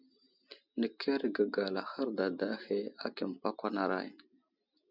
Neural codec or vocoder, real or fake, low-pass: none; real; 5.4 kHz